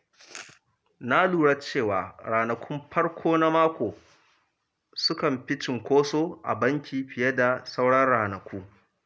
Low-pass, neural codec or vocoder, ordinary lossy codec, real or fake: none; none; none; real